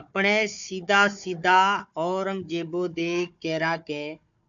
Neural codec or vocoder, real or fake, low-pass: codec, 16 kHz, 4 kbps, FunCodec, trained on Chinese and English, 50 frames a second; fake; 7.2 kHz